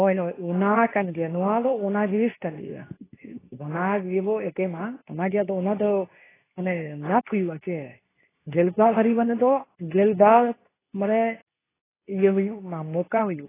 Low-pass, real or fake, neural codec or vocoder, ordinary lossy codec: 3.6 kHz; fake; codec, 24 kHz, 0.9 kbps, WavTokenizer, medium speech release version 2; AAC, 16 kbps